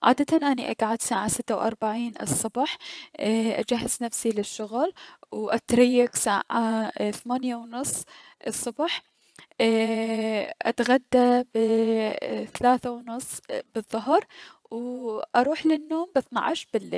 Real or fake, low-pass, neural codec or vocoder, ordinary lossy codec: fake; none; vocoder, 22.05 kHz, 80 mel bands, Vocos; none